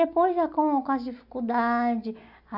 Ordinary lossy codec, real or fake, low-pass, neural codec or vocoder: none; real; 5.4 kHz; none